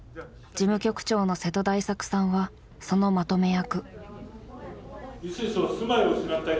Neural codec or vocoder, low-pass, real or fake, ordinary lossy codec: none; none; real; none